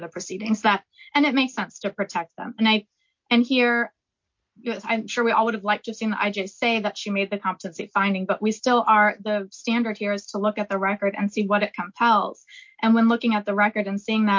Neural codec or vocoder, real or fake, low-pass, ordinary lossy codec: none; real; 7.2 kHz; MP3, 48 kbps